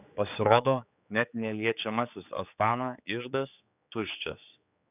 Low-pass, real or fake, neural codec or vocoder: 3.6 kHz; fake; codec, 16 kHz, 4 kbps, X-Codec, HuBERT features, trained on general audio